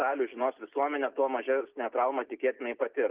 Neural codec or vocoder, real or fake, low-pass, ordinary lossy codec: none; real; 3.6 kHz; Opus, 32 kbps